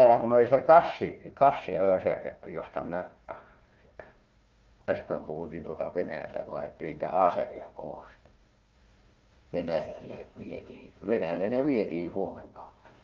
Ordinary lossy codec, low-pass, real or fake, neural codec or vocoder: Opus, 24 kbps; 7.2 kHz; fake; codec, 16 kHz, 1 kbps, FunCodec, trained on Chinese and English, 50 frames a second